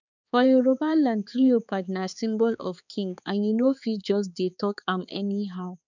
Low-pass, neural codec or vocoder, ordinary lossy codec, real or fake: 7.2 kHz; codec, 16 kHz, 4 kbps, X-Codec, HuBERT features, trained on balanced general audio; none; fake